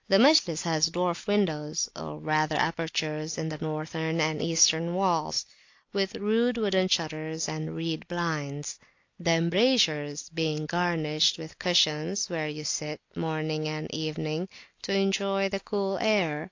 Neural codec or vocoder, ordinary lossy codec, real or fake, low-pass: none; AAC, 48 kbps; real; 7.2 kHz